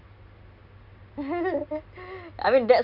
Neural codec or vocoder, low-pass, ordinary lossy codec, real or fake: none; 5.4 kHz; Opus, 64 kbps; real